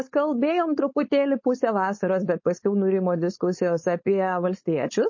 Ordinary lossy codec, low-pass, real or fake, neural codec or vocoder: MP3, 32 kbps; 7.2 kHz; fake; codec, 16 kHz, 4.8 kbps, FACodec